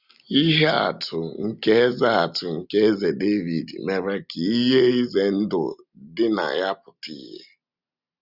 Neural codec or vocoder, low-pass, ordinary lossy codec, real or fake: none; 5.4 kHz; Opus, 64 kbps; real